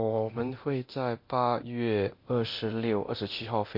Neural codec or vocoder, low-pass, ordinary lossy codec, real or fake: codec, 24 kHz, 0.9 kbps, DualCodec; 5.4 kHz; MP3, 32 kbps; fake